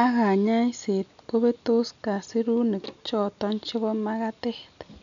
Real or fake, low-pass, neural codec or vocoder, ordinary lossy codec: real; 7.2 kHz; none; none